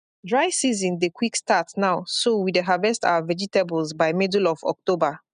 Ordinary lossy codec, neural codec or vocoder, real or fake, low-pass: none; none; real; 9.9 kHz